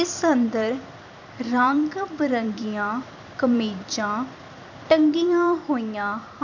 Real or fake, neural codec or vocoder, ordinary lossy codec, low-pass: fake; vocoder, 44.1 kHz, 128 mel bands every 256 samples, BigVGAN v2; none; 7.2 kHz